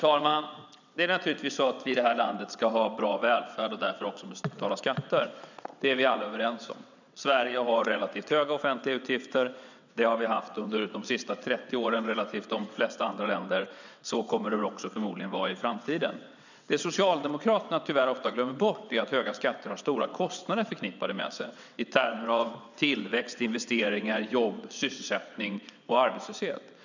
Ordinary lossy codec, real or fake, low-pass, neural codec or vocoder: none; fake; 7.2 kHz; vocoder, 22.05 kHz, 80 mel bands, WaveNeXt